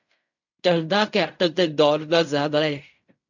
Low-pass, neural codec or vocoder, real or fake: 7.2 kHz; codec, 16 kHz in and 24 kHz out, 0.4 kbps, LongCat-Audio-Codec, fine tuned four codebook decoder; fake